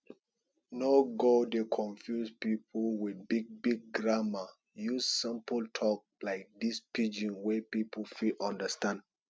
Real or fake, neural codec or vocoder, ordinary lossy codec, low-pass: real; none; none; none